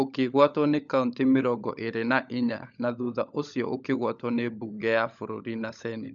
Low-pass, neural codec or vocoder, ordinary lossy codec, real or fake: 7.2 kHz; codec, 16 kHz, 16 kbps, FunCodec, trained on LibriTTS, 50 frames a second; none; fake